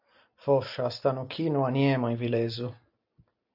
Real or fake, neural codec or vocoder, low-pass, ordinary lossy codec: real; none; 5.4 kHz; AAC, 48 kbps